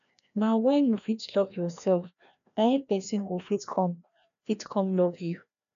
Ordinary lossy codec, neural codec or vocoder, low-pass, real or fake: none; codec, 16 kHz, 1 kbps, FreqCodec, larger model; 7.2 kHz; fake